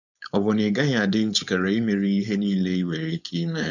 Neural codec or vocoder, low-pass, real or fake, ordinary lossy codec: codec, 16 kHz, 4.8 kbps, FACodec; 7.2 kHz; fake; none